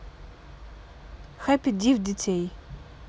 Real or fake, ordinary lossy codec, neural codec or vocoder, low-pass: real; none; none; none